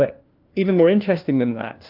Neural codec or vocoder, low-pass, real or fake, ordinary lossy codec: codec, 16 kHz, 1 kbps, FunCodec, trained on LibriTTS, 50 frames a second; 5.4 kHz; fake; Opus, 24 kbps